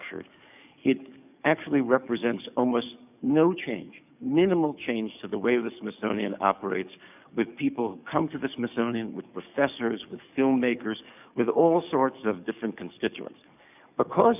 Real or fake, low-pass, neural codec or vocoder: fake; 3.6 kHz; codec, 44.1 kHz, 7.8 kbps, DAC